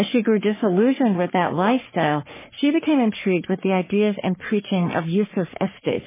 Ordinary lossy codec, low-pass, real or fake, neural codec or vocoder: MP3, 16 kbps; 3.6 kHz; fake; codec, 44.1 kHz, 3.4 kbps, Pupu-Codec